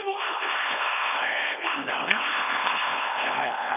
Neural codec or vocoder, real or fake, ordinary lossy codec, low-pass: codec, 24 kHz, 0.9 kbps, WavTokenizer, small release; fake; none; 3.6 kHz